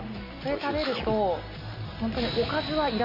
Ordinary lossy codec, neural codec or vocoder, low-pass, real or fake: MP3, 32 kbps; none; 5.4 kHz; real